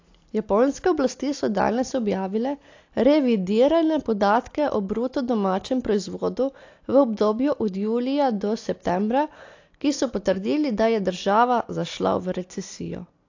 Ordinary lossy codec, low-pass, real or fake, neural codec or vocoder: AAC, 48 kbps; 7.2 kHz; real; none